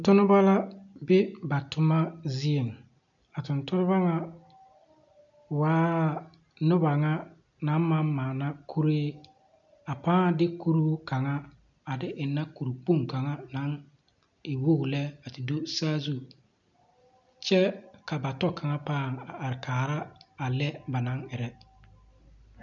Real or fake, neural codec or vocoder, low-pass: real; none; 7.2 kHz